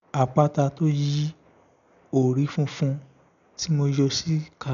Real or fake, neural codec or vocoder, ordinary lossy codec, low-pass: real; none; none; 7.2 kHz